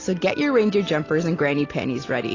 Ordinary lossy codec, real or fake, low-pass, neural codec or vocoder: AAC, 32 kbps; real; 7.2 kHz; none